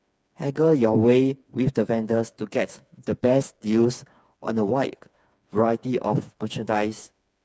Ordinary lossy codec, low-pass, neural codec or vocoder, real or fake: none; none; codec, 16 kHz, 4 kbps, FreqCodec, smaller model; fake